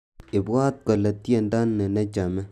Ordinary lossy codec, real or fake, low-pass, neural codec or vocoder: none; real; 14.4 kHz; none